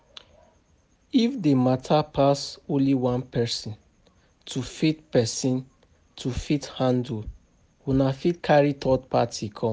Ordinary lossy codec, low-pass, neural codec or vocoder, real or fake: none; none; none; real